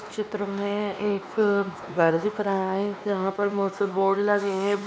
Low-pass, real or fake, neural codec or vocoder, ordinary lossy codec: none; fake; codec, 16 kHz, 2 kbps, X-Codec, WavLM features, trained on Multilingual LibriSpeech; none